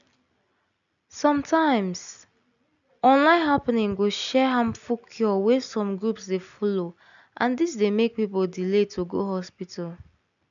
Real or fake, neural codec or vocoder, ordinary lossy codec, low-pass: real; none; none; 7.2 kHz